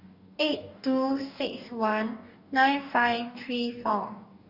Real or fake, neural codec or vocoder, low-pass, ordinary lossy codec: fake; codec, 44.1 kHz, 2.6 kbps, DAC; 5.4 kHz; Opus, 64 kbps